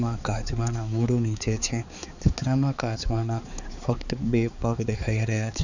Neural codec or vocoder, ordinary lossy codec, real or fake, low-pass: codec, 16 kHz, 4 kbps, X-Codec, HuBERT features, trained on balanced general audio; none; fake; 7.2 kHz